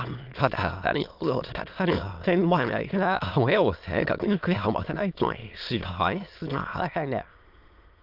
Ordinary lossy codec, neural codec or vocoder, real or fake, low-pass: Opus, 24 kbps; autoencoder, 22.05 kHz, a latent of 192 numbers a frame, VITS, trained on many speakers; fake; 5.4 kHz